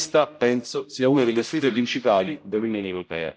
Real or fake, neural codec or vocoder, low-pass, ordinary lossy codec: fake; codec, 16 kHz, 0.5 kbps, X-Codec, HuBERT features, trained on general audio; none; none